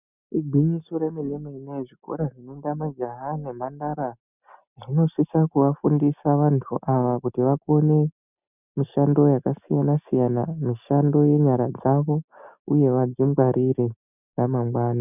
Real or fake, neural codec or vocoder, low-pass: real; none; 3.6 kHz